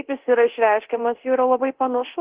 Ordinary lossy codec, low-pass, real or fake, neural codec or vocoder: Opus, 16 kbps; 3.6 kHz; fake; codec, 24 kHz, 0.9 kbps, DualCodec